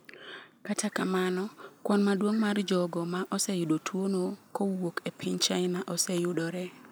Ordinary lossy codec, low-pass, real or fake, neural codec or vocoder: none; none; real; none